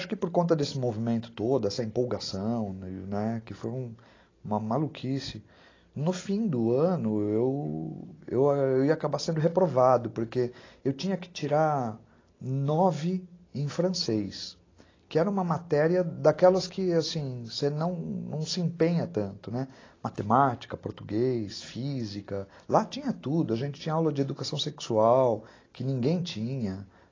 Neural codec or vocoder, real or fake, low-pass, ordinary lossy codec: none; real; 7.2 kHz; AAC, 32 kbps